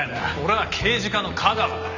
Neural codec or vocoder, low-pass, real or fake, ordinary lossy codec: none; 7.2 kHz; real; none